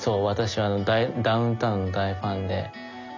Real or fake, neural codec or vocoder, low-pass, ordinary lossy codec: real; none; 7.2 kHz; none